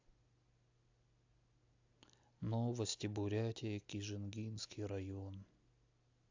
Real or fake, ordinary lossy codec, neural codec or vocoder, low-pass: real; AAC, 48 kbps; none; 7.2 kHz